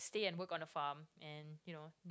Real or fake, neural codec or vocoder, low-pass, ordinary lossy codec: real; none; none; none